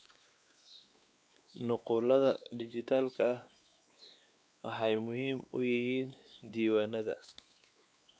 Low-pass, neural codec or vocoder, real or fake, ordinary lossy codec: none; codec, 16 kHz, 2 kbps, X-Codec, WavLM features, trained on Multilingual LibriSpeech; fake; none